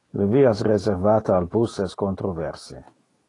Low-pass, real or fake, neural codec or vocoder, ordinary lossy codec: 10.8 kHz; fake; autoencoder, 48 kHz, 128 numbers a frame, DAC-VAE, trained on Japanese speech; AAC, 32 kbps